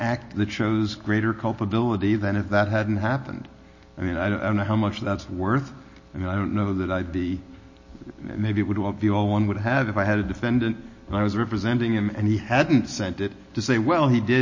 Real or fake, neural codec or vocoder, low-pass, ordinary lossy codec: real; none; 7.2 kHz; MP3, 32 kbps